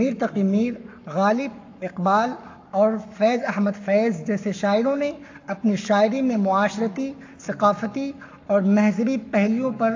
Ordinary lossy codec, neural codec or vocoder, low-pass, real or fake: AAC, 48 kbps; codec, 44.1 kHz, 7.8 kbps, Pupu-Codec; 7.2 kHz; fake